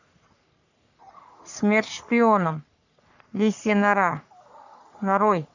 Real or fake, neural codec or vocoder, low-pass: fake; codec, 44.1 kHz, 3.4 kbps, Pupu-Codec; 7.2 kHz